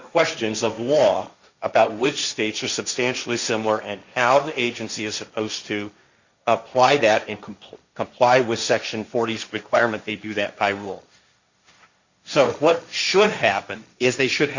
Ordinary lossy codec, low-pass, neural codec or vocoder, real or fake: Opus, 64 kbps; 7.2 kHz; codec, 16 kHz, 1.1 kbps, Voila-Tokenizer; fake